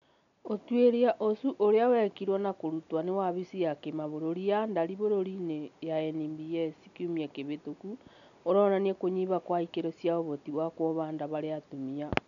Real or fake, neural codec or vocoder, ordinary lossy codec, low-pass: real; none; none; 7.2 kHz